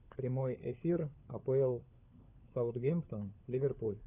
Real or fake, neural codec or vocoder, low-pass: fake; codec, 16 kHz, 4 kbps, FunCodec, trained on LibriTTS, 50 frames a second; 3.6 kHz